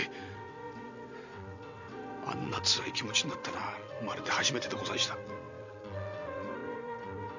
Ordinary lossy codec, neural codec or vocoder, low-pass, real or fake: none; vocoder, 22.05 kHz, 80 mel bands, WaveNeXt; 7.2 kHz; fake